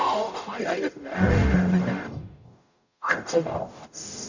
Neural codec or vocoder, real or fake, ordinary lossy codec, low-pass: codec, 44.1 kHz, 0.9 kbps, DAC; fake; none; 7.2 kHz